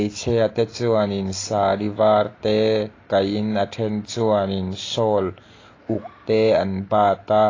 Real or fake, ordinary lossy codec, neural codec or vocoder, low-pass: real; AAC, 32 kbps; none; 7.2 kHz